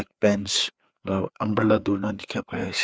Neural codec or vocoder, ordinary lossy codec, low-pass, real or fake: codec, 16 kHz, 4 kbps, FreqCodec, larger model; none; none; fake